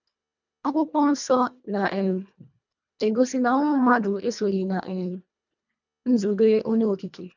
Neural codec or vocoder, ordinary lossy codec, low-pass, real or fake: codec, 24 kHz, 1.5 kbps, HILCodec; none; 7.2 kHz; fake